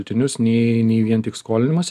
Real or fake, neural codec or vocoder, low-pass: real; none; 14.4 kHz